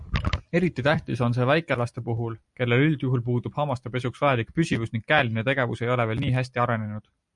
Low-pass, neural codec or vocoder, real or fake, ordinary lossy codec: 10.8 kHz; none; real; MP3, 96 kbps